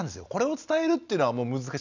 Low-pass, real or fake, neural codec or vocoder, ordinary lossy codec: 7.2 kHz; real; none; none